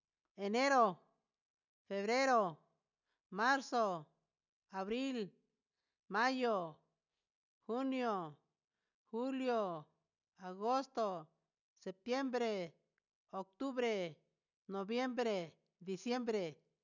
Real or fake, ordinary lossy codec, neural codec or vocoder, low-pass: real; none; none; 7.2 kHz